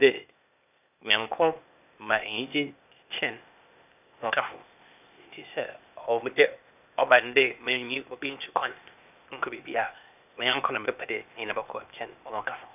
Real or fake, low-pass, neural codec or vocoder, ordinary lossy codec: fake; 3.6 kHz; codec, 16 kHz, 0.8 kbps, ZipCodec; none